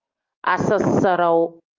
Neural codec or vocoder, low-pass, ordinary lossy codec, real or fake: none; 7.2 kHz; Opus, 24 kbps; real